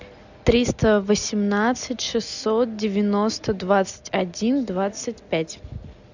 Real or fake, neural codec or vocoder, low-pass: real; none; 7.2 kHz